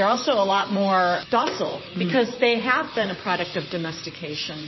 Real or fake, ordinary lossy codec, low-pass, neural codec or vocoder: fake; MP3, 24 kbps; 7.2 kHz; vocoder, 44.1 kHz, 128 mel bands, Pupu-Vocoder